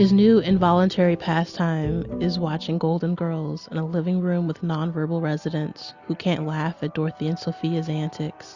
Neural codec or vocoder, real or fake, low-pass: none; real; 7.2 kHz